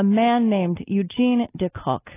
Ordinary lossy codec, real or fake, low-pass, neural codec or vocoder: AAC, 16 kbps; real; 3.6 kHz; none